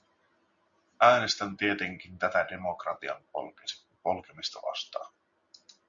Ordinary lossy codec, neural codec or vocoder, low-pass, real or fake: Opus, 64 kbps; none; 7.2 kHz; real